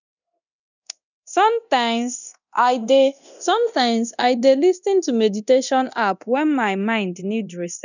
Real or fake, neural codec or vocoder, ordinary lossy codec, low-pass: fake; codec, 24 kHz, 0.9 kbps, DualCodec; none; 7.2 kHz